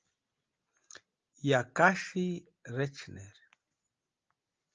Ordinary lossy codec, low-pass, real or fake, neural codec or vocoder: Opus, 24 kbps; 7.2 kHz; real; none